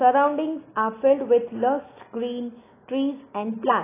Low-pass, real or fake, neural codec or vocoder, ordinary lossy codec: 3.6 kHz; real; none; AAC, 16 kbps